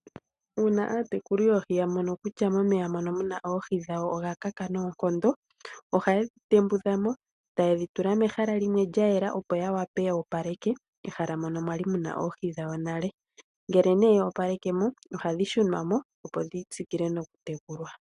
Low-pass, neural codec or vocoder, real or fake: 10.8 kHz; none; real